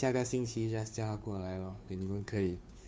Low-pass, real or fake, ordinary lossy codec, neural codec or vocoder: none; fake; none; codec, 16 kHz, 2 kbps, FunCodec, trained on Chinese and English, 25 frames a second